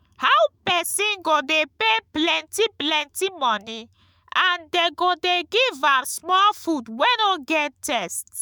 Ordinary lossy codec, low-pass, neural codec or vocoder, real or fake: none; none; autoencoder, 48 kHz, 128 numbers a frame, DAC-VAE, trained on Japanese speech; fake